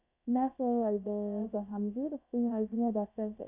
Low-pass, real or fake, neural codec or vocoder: 3.6 kHz; fake; codec, 16 kHz, about 1 kbps, DyCAST, with the encoder's durations